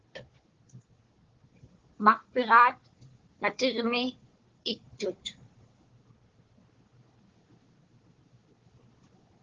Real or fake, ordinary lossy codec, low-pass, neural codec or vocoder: fake; Opus, 16 kbps; 7.2 kHz; codec, 16 kHz, 4 kbps, FunCodec, trained on Chinese and English, 50 frames a second